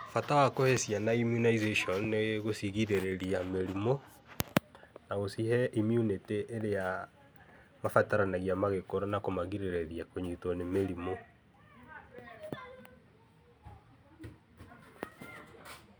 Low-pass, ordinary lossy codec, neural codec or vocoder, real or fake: none; none; none; real